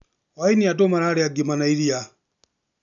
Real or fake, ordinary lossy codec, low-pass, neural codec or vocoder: real; none; 7.2 kHz; none